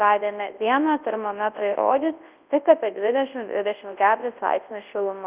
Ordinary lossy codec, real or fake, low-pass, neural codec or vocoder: Opus, 32 kbps; fake; 3.6 kHz; codec, 24 kHz, 0.9 kbps, WavTokenizer, large speech release